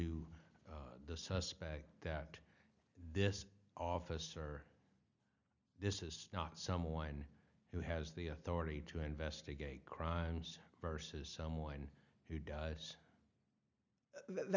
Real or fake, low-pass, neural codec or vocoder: real; 7.2 kHz; none